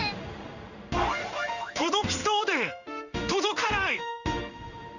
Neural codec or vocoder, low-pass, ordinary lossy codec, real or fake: codec, 16 kHz in and 24 kHz out, 1 kbps, XY-Tokenizer; 7.2 kHz; none; fake